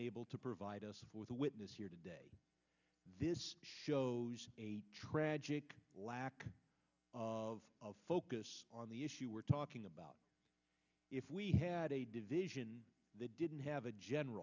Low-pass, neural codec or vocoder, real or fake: 7.2 kHz; none; real